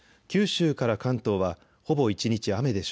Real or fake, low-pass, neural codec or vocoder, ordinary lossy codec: real; none; none; none